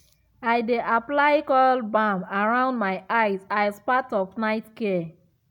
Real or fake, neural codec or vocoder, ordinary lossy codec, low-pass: real; none; none; 19.8 kHz